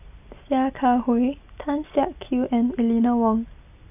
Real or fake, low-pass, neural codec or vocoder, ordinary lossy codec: real; 3.6 kHz; none; none